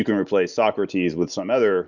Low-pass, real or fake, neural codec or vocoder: 7.2 kHz; real; none